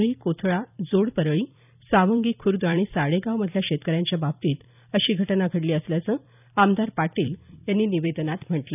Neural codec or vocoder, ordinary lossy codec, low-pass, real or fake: vocoder, 44.1 kHz, 128 mel bands every 256 samples, BigVGAN v2; none; 3.6 kHz; fake